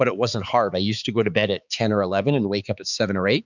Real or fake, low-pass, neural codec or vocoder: fake; 7.2 kHz; codec, 16 kHz, 4 kbps, X-Codec, HuBERT features, trained on balanced general audio